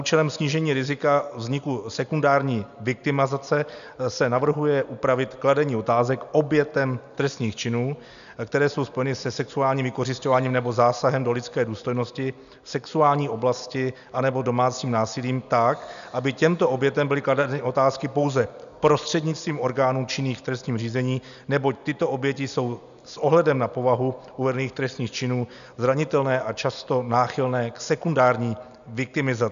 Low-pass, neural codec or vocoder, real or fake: 7.2 kHz; none; real